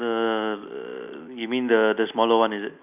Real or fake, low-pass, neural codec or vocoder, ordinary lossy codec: real; 3.6 kHz; none; none